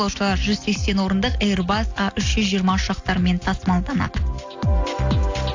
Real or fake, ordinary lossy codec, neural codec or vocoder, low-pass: real; AAC, 48 kbps; none; 7.2 kHz